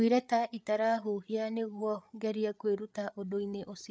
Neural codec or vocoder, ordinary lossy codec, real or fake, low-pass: codec, 16 kHz, 8 kbps, FreqCodec, larger model; none; fake; none